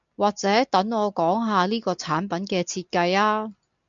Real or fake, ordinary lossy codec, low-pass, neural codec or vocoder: real; MP3, 64 kbps; 7.2 kHz; none